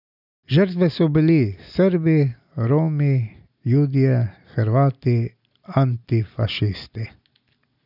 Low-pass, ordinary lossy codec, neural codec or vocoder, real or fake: 5.4 kHz; none; none; real